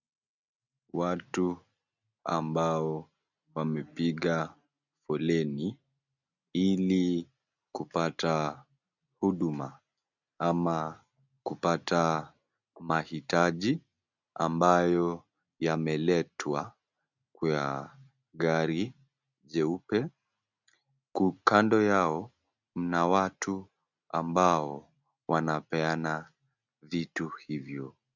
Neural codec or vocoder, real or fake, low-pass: none; real; 7.2 kHz